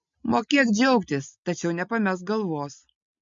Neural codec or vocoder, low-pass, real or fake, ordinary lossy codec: none; 7.2 kHz; real; MP3, 48 kbps